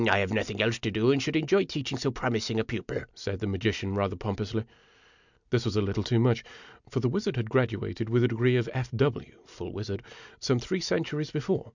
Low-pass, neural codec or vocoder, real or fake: 7.2 kHz; none; real